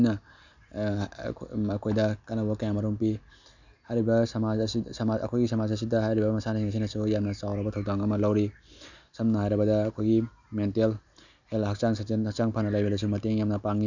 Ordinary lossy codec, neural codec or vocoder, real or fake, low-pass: none; none; real; 7.2 kHz